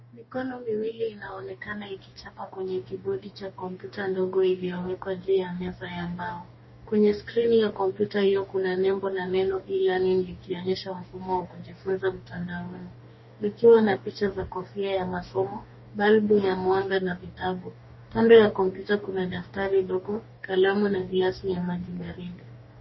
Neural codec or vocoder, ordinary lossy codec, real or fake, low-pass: codec, 44.1 kHz, 2.6 kbps, DAC; MP3, 24 kbps; fake; 7.2 kHz